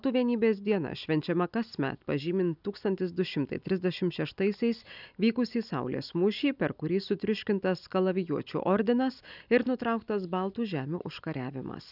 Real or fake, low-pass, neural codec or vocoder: real; 5.4 kHz; none